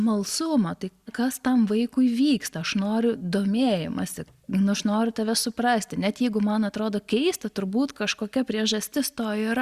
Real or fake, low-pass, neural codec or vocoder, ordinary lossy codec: real; 14.4 kHz; none; Opus, 64 kbps